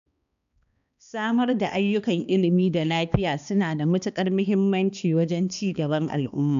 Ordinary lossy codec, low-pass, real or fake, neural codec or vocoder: none; 7.2 kHz; fake; codec, 16 kHz, 2 kbps, X-Codec, HuBERT features, trained on balanced general audio